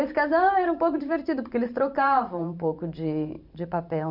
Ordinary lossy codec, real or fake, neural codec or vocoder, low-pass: none; fake; vocoder, 44.1 kHz, 128 mel bands every 512 samples, BigVGAN v2; 5.4 kHz